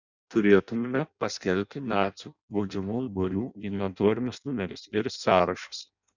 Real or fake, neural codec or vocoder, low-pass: fake; codec, 16 kHz in and 24 kHz out, 0.6 kbps, FireRedTTS-2 codec; 7.2 kHz